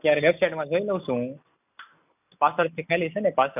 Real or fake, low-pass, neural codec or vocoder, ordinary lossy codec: real; 3.6 kHz; none; none